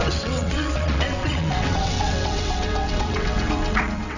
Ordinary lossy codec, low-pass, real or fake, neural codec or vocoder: none; 7.2 kHz; fake; vocoder, 22.05 kHz, 80 mel bands, WaveNeXt